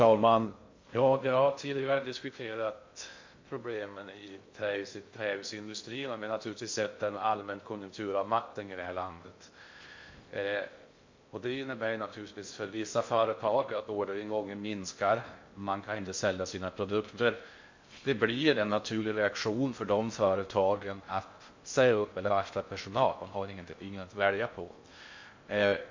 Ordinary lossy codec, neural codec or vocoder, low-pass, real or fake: MP3, 48 kbps; codec, 16 kHz in and 24 kHz out, 0.6 kbps, FocalCodec, streaming, 2048 codes; 7.2 kHz; fake